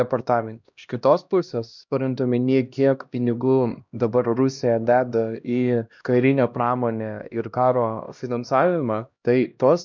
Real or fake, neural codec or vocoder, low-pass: fake; codec, 16 kHz, 1 kbps, X-Codec, HuBERT features, trained on LibriSpeech; 7.2 kHz